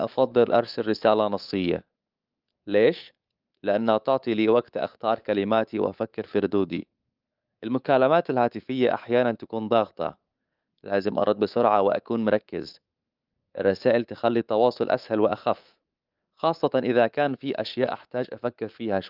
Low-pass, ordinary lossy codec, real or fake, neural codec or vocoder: 5.4 kHz; Opus, 32 kbps; fake; codec, 24 kHz, 3.1 kbps, DualCodec